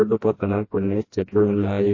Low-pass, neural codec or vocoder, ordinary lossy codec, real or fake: 7.2 kHz; codec, 16 kHz, 1 kbps, FreqCodec, smaller model; MP3, 32 kbps; fake